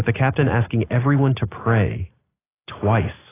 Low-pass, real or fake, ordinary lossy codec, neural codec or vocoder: 3.6 kHz; real; AAC, 16 kbps; none